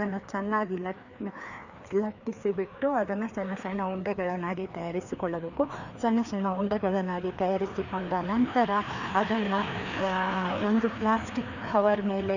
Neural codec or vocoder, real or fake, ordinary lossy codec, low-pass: codec, 16 kHz, 2 kbps, FreqCodec, larger model; fake; none; 7.2 kHz